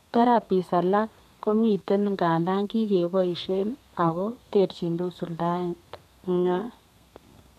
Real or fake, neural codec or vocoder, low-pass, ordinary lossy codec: fake; codec, 32 kHz, 1.9 kbps, SNAC; 14.4 kHz; none